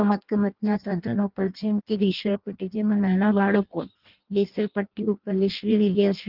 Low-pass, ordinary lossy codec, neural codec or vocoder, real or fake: 5.4 kHz; Opus, 32 kbps; codec, 16 kHz in and 24 kHz out, 0.6 kbps, FireRedTTS-2 codec; fake